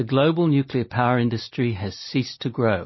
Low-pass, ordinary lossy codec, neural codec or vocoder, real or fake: 7.2 kHz; MP3, 24 kbps; none; real